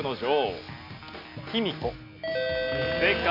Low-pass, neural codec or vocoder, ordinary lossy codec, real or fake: 5.4 kHz; none; none; real